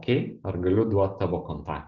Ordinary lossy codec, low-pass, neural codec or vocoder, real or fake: Opus, 32 kbps; 7.2 kHz; none; real